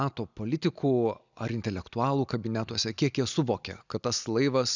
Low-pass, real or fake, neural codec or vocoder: 7.2 kHz; real; none